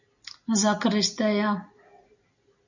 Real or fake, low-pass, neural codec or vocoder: real; 7.2 kHz; none